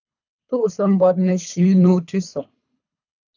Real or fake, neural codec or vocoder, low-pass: fake; codec, 24 kHz, 3 kbps, HILCodec; 7.2 kHz